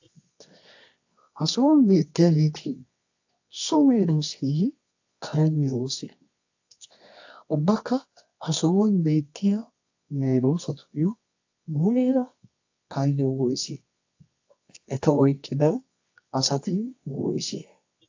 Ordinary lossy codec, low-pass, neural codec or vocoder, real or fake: AAC, 48 kbps; 7.2 kHz; codec, 24 kHz, 0.9 kbps, WavTokenizer, medium music audio release; fake